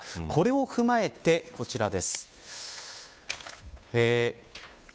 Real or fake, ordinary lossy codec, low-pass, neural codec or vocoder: fake; none; none; codec, 16 kHz, 2 kbps, FunCodec, trained on Chinese and English, 25 frames a second